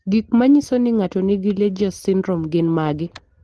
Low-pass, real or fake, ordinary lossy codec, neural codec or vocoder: 10.8 kHz; real; Opus, 16 kbps; none